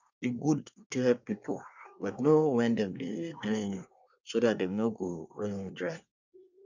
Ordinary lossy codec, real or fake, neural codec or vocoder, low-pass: none; fake; codec, 24 kHz, 1 kbps, SNAC; 7.2 kHz